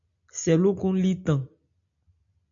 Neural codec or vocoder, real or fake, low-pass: none; real; 7.2 kHz